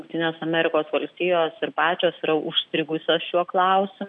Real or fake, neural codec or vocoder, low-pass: real; none; 10.8 kHz